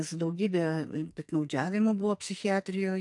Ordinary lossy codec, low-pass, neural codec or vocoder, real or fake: AAC, 64 kbps; 10.8 kHz; codec, 44.1 kHz, 2.6 kbps, SNAC; fake